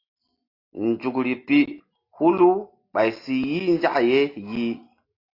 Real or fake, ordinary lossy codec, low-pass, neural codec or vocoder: real; AAC, 32 kbps; 5.4 kHz; none